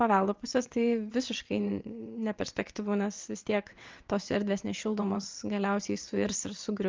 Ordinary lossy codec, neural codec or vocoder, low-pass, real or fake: Opus, 24 kbps; vocoder, 22.05 kHz, 80 mel bands, WaveNeXt; 7.2 kHz; fake